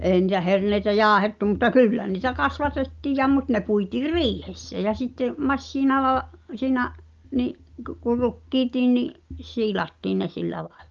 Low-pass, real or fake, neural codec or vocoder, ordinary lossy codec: 7.2 kHz; real; none; Opus, 32 kbps